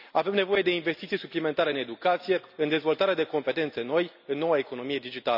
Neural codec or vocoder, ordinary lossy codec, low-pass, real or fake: none; none; 5.4 kHz; real